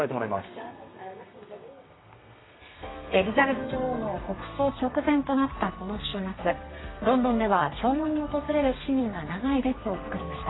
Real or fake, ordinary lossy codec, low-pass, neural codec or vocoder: fake; AAC, 16 kbps; 7.2 kHz; codec, 32 kHz, 1.9 kbps, SNAC